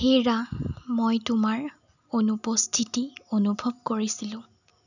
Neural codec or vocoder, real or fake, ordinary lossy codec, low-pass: none; real; none; 7.2 kHz